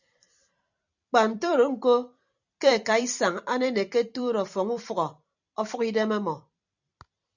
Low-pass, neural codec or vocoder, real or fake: 7.2 kHz; vocoder, 44.1 kHz, 128 mel bands every 256 samples, BigVGAN v2; fake